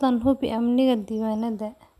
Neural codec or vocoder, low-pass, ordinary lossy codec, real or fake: none; 14.4 kHz; none; real